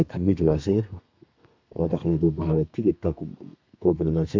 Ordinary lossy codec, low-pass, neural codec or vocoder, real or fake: MP3, 64 kbps; 7.2 kHz; codec, 32 kHz, 1.9 kbps, SNAC; fake